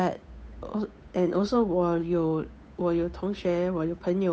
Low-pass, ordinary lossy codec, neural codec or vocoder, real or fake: none; none; codec, 16 kHz, 8 kbps, FunCodec, trained on Chinese and English, 25 frames a second; fake